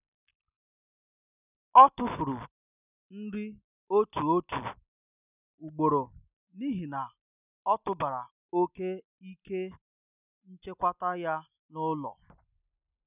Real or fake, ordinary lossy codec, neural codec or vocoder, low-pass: real; none; none; 3.6 kHz